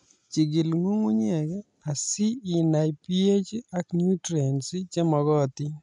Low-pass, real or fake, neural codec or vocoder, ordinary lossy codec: 10.8 kHz; real; none; none